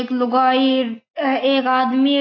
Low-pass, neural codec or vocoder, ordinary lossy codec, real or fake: 7.2 kHz; none; AAC, 32 kbps; real